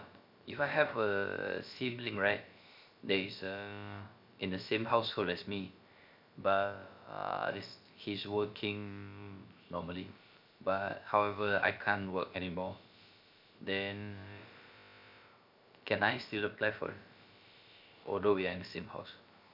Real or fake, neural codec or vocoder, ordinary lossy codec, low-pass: fake; codec, 16 kHz, about 1 kbps, DyCAST, with the encoder's durations; MP3, 48 kbps; 5.4 kHz